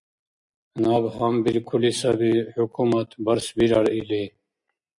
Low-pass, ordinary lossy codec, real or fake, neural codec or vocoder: 10.8 kHz; MP3, 64 kbps; real; none